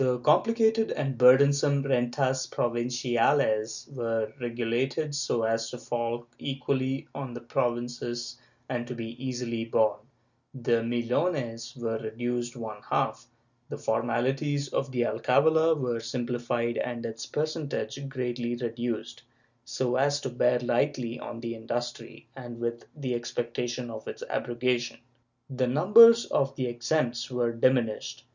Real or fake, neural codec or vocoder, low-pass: real; none; 7.2 kHz